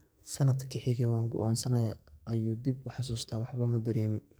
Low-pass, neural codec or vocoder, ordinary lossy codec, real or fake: none; codec, 44.1 kHz, 2.6 kbps, SNAC; none; fake